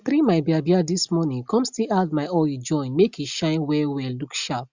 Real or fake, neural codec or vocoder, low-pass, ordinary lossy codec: real; none; 7.2 kHz; Opus, 64 kbps